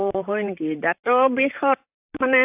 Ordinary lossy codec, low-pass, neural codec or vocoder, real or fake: AAC, 32 kbps; 3.6 kHz; vocoder, 44.1 kHz, 128 mel bands, Pupu-Vocoder; fake